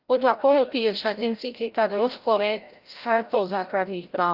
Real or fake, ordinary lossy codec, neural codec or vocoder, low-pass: fake; Opus, 32 kbps; codec, 16 kHz, 0.5 kbps, FreqCodec, larger model; 5.4 kHz